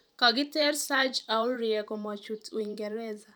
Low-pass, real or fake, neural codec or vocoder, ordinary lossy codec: none; fake; vocoder, 44.1 kHz, 128 mel bands every 512 samples, BigVGAN v2; none